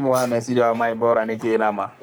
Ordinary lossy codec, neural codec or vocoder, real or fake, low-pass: none; codec, 44.1 kHz, 3.4 kbps, Pupu-Codec; fake; none